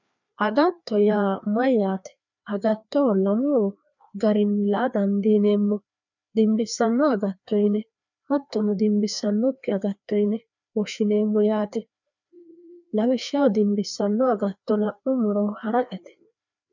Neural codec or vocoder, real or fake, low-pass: codec, 16 kHz, 2 kbps, FreqCodec, larger model; fake; 7.2 kHz